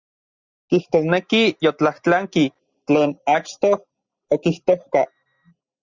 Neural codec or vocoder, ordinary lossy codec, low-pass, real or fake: none; Opus, 64 kbps; 7.2 kHz; real